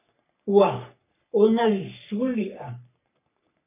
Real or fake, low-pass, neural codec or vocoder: fake; 3.6 kHz; codec, 44.1 kHz, 3.4 kbps, Pupu-Codec